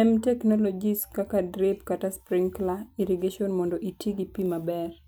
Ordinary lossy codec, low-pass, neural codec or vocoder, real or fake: none; none; none; real